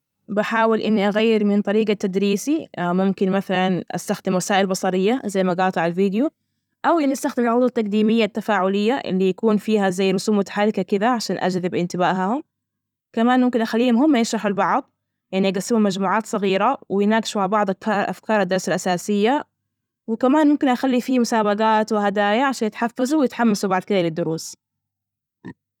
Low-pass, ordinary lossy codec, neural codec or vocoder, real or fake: 19.8 kHz; none; vocoder, 44.1 kHz, 128 mel bands every 256 samples, BigVGAN v2; fake